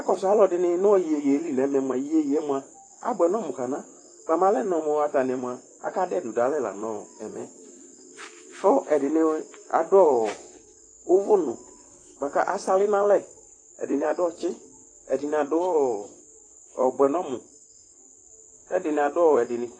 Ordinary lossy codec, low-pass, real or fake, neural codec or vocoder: AAC, 32 kbps; 9.9 kHz; fake; vocoder, 44.1 kHz, 128 mel bands, Pupu-Vocoder